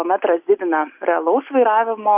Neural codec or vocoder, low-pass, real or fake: none; 3.6 kHz; real